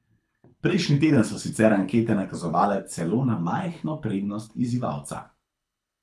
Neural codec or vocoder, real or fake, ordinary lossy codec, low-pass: codec, 24 kHz, 6 kbps, HILCodec; fake; none; none